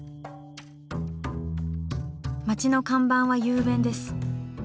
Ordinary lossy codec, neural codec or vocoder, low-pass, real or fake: none; none; none; real